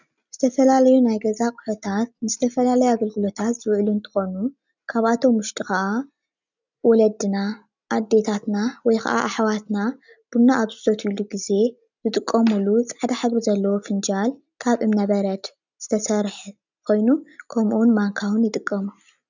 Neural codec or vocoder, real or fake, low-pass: none; real; 7.2 kHz